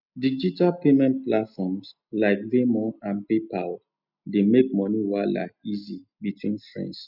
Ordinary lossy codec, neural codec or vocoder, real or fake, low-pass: none; none; real; 5.4 kHz